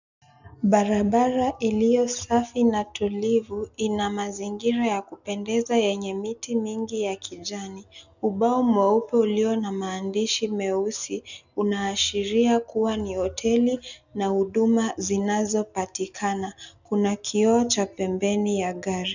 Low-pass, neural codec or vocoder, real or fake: 7.2 kHz; none; real